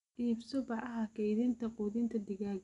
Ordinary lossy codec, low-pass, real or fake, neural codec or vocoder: none; 10.8 kHz; real; none